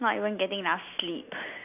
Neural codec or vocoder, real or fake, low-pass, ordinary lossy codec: none; real; 3.6 kHz; none